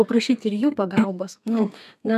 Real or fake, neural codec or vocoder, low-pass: fake; codec, 32 kHz, 1.9 kbps, SNAC; 14.4 kHz